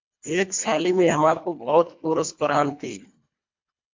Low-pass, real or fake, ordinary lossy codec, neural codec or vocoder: 7.2 kHz; fake; MP3, 64 kbps; codec, 24 kHz, 1.5 kbps, HILCodec